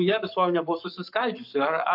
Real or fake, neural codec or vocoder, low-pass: fake; codec, 44.1 kHz, 7.8 kbps, Pupu-Codec; 5.4 kHz